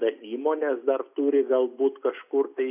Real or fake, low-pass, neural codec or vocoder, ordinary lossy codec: real; 3.6 kHz; none; AAC, 32 kbps